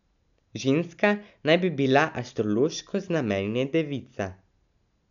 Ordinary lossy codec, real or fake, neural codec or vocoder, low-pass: none; real; none; 7.2 kHz